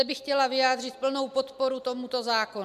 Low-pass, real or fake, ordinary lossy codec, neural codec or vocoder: 14.4 kHz; real; MP3, 96 kbps; none